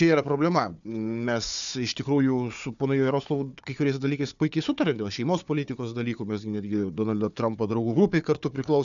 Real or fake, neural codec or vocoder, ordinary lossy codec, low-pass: fake; codec, 16 kHz, 4 kbps, FunCodec, trained on Chinese and English, 50 frames a second; MP3, 96 kbps; 7.2 kHz